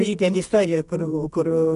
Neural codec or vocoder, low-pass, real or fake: codec, 24 kHz, 0.9 kbps, WavTokenizer, medium music audio release; 10.8 kHz; fake